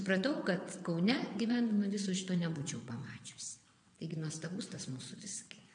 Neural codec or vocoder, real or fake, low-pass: vocoder, 22.05 kHz, 80 mel bands, WaveNeXt; fake; 9.9 kHz